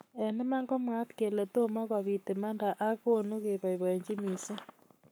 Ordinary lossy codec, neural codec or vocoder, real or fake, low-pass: none; codec, 44.1 kHz, 7.8 kbps, Pupu-Codec; fake; none